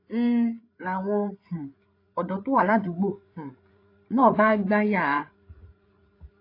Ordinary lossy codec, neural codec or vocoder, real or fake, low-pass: AAC, 32 kbps; codec, 16 kHz, 8 kbps, FreqCodec, larger model; fake; 5.4 kHz